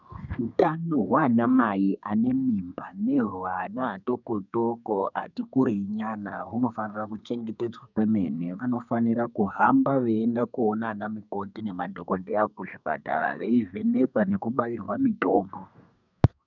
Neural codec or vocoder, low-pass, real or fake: codec, 32 kHz, 1.9 kbps, SNAC; 7.2 kHz; fake